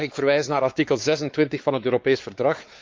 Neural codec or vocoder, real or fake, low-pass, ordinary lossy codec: codec, 16 kHz, 4 kbps, X-Codec, WavLM features, trained on Multilingual LibriSpeech; fake; 7.2 kHz; Opus, 32 kbps